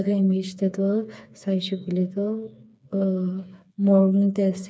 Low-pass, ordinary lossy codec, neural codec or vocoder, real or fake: none; none; codec, 16 kHz, 4 kbps, FreqCodec, smaller model; fake